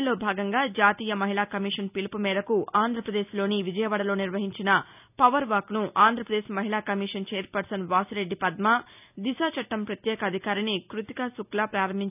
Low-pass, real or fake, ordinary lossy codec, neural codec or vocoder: 3.6 kHz; real; none; none